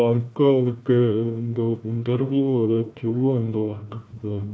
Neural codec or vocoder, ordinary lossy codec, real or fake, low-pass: codec, 16 kHz, 1 kbps, FunCodec, trained on Chinese and English, 50 frames a second; none; fake; none